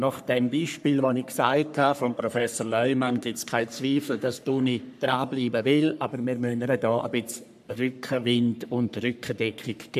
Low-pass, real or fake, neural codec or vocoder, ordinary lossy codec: 14.4 kHz; fake; codec, 44.1 kHz, 3.4 kbps, Pupu-Codec; none